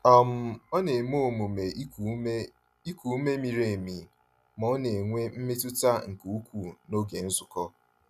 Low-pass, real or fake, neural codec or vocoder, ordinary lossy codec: 14.4 kHz; real; none; none